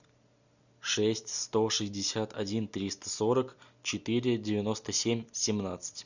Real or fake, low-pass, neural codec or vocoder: real; 7.2 kHz; none